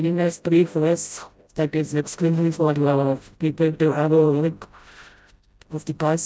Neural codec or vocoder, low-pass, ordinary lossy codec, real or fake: codec, 16 kHz, 0.5 kbps, FreqCodec, smaller model; none; none; fake